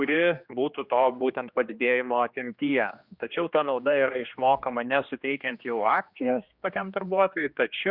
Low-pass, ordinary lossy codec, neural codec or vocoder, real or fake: 5.4 kHz; Opus, 64 kbps; codec, 16 kHz, 2 kbps, X-Codec, HuBERT features, trained on general audio; fake